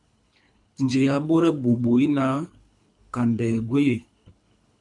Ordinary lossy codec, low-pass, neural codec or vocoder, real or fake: MP3, 64 kbps; 10.8 kHz; codec, 24 kHz, 3 kbps, HILCodec; fake